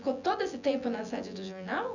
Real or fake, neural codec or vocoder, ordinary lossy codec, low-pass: fake; vocoder, 24 kHz, 100 mel bands, Vocos; none; 7.2 kHz